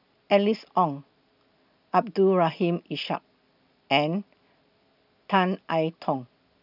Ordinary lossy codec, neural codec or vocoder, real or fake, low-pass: none; none; real; 5.4 kHz